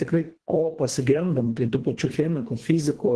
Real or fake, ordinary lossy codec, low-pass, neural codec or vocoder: fake; Opus, 16 kbps; 10.8 kHz; codec, 24 kHz, 1.5 kbps, HILCodec